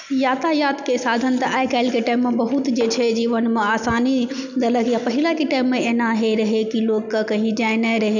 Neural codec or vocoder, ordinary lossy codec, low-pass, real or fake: none; none; 7.2 kHz; real